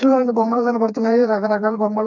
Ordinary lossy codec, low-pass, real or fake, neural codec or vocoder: none; 7.2 kHz; fake; codec, 16 kHz, 2 kbps, FreqCodec, smaller model